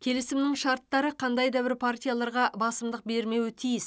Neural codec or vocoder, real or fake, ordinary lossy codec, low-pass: none; real; none; none